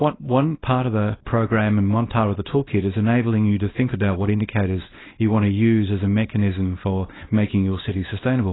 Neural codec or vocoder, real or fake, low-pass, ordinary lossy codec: codec, 24 kHz, 0.9 kbps, WavTokenizer, small release; fake; 7.2 kHz; AAC, 16 kbps